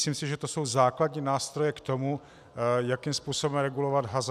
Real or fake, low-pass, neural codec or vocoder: real; 14.4 kHz; none